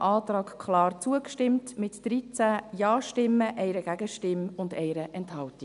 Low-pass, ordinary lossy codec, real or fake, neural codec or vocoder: 10.8 kHz; none; real; none